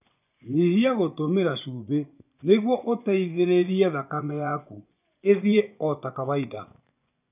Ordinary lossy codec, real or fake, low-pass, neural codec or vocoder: none; fake; 3.6 kHz; vocoder, 24 kHz, 100 mel bands, Vocos